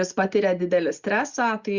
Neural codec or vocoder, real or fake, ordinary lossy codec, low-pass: none; real; Opus, 64 kbps; 7.2 kHz